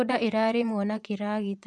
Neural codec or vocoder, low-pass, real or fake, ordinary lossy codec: vocoder, 24 kHz, 100 mel bands, Vocos; none; fake; none